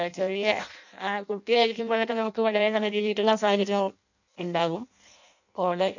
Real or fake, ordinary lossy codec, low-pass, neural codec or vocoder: fake; none; 7.2 kHz; codec, 16 kHz in and 24 kHz out, 0.6 kbps, FireRedTTS-2 codec